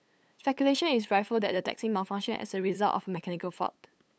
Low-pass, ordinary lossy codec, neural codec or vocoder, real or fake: none; none; codec, 16 kHz, 8 kbps, FunCodec, trained on LibriTTS, 25 frames a second; fake